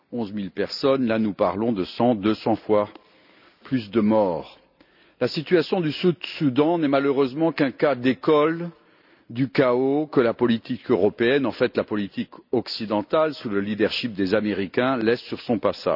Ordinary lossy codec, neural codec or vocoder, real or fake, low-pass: none; none; real; 5.4 kHz